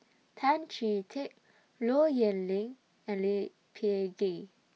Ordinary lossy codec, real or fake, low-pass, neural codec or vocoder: none; real; none; none